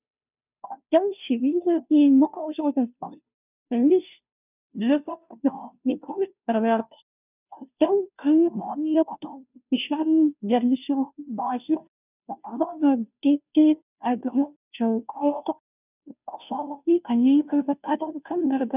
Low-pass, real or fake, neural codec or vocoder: 3.6 kHz; fake; codec, 16 kHz, 0.5 kbps, FunCodec, trained on Chinese and English, 25 frames a second